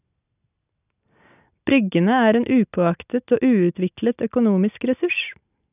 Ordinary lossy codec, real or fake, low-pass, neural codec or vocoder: none; real; 3.6 kHz; none